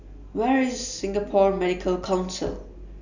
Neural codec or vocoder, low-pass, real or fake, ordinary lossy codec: none; 7.2 kHz; real; none